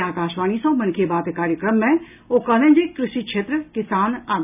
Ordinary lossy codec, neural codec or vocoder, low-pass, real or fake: none; none; 3.6 kHz; real